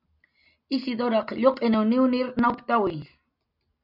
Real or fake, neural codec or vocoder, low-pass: real; none; 5.4 kHz